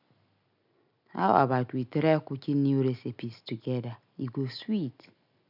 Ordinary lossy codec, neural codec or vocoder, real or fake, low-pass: none; none; real; 5.4 kHz